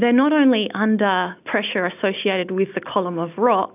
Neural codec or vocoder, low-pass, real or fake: none; 3.6 kHz; real